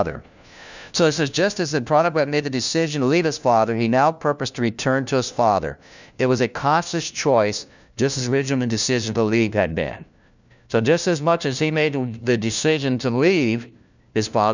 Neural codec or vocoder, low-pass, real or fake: codec, 16 kHz, 1 kbps, FunCodec, trained on LibriTTS, 50 frames a second; 7.2 kHz; fake